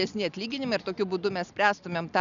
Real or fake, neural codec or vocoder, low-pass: real; none; 7.2 kHz